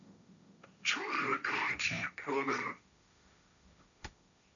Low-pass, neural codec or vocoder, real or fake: 7.2 kHz; codec, 16 kHz, 1.1 kbps, Voila-Tokenizer; fake